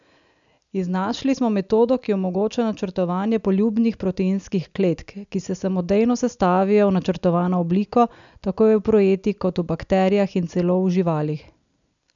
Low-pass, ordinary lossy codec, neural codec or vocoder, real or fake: 7.2 kHz; none; none; real